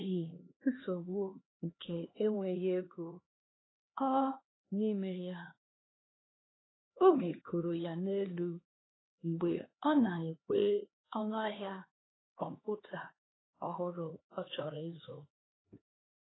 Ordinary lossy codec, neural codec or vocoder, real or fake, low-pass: AAC, 16 kbps; codec, 16 kHz, 2 kbps, X-Codec, HuBERT features, trained on LibriSpeech; fake; 7.2 kHz